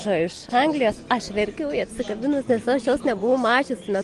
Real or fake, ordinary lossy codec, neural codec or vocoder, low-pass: real; Opus, 24 kbps; none; 10.8 kHz